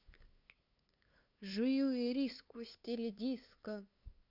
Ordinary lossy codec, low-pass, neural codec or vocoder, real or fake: none; 5.4 kHz; codec, 16 kHz, 2 kbps, FunCodec, trained on LibriTTS, 25 frames a second; fake